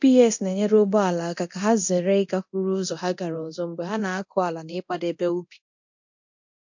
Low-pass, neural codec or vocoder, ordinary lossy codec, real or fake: 7.2 kHz; codec, 24 kHz, 0.9 kbps, DualCodec; MP3, 48 kbps; fake